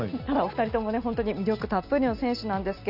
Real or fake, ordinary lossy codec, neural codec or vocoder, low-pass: real; none; none; 5.4 kHz